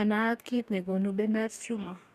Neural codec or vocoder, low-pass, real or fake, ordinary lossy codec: codec, 44.1 kHz, 2.6 kbps, DAC; 14.4 kHz; fake; none